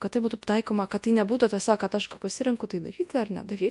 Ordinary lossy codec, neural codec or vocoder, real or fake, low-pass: AAC, 64 kbps; codec, 24 kHz, 0.9 kbps, WavTokenizer, large speech release; fake; 10.8 kHz